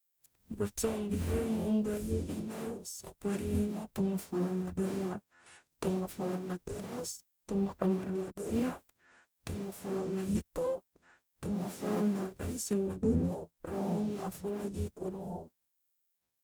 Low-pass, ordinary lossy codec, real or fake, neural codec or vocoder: none; none; fake; codec, 44.1 kHz, 0.9 kbps, DAC